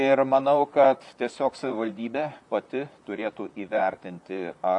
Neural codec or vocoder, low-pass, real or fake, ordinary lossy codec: vocoder, 44.1 kHz, 128 mel bands, Pupu-Vocoder; 10.8 kHz; fake; AAC, 64 kbps